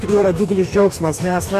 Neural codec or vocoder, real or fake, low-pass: codec, 44.1 kHz, 2.6 kbps, DAC; fake; 14.4 kHz